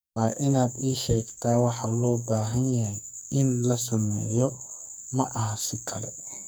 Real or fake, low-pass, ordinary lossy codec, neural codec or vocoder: fake; none; none; codec, 44.1 kHz, 2.6 kbps, SNAC